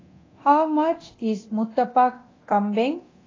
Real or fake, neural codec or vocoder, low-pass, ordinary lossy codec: fake; codec, 24 kHz, 0.9 kbps, DualCodec; 7.2 kHz; AAC, 32 kbps